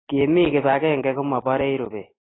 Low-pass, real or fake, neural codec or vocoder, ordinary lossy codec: 7.2 kHz; real; none; AAC, 16 kbps